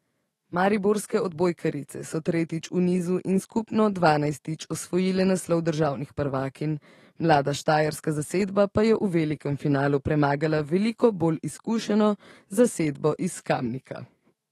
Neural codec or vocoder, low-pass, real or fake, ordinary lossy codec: autoencoder, 48 kHz, 128 numbers a frame, DAC-VAE, trained on Japanese speech; 19.8 kHz; fake; AAC, 32 kbps